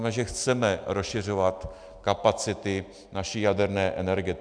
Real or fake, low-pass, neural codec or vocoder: real; 9.9 kHz; none